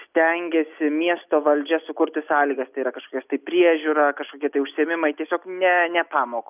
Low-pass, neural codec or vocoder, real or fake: 3.6 kHz; none; real